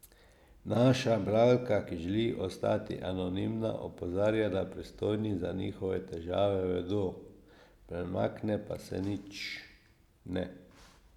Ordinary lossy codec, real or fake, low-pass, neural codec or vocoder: none; real; 19.8 kHz; none